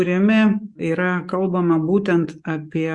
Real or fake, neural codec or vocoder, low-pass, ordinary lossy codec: fake; codec, 44.1 kHz, 7.8 kbps, DAC; 10.8 kHz; Opus, 64 kbps